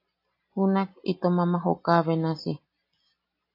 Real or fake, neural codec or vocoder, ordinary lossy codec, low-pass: real; none; AAC, 32 kbps; 5.4 kHz